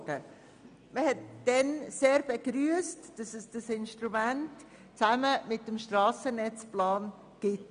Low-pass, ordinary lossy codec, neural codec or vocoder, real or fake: 9.9 kHz; none; none; real